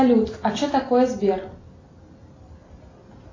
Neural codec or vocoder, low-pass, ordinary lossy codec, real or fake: none; 7.2 kHz; AAC, 32 kbps; real